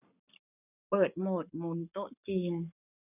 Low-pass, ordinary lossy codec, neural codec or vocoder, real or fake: 3.6 kHz; none; codec, 44.1 kHz, 7.8 kbps, Pupu-Codec; fake